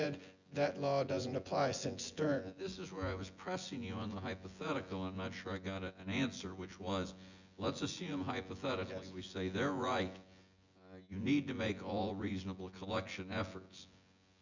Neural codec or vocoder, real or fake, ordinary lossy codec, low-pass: vocoder, 24 kHz, 100 mel bands, Vocos; fake; Opus, 64 kbps; 7.2 kHz